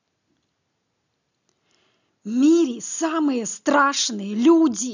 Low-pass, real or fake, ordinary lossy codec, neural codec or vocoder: 7.2 kHz; real; none; none